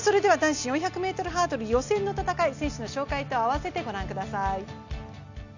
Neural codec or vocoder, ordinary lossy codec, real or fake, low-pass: none; none; real; 7.2 kHz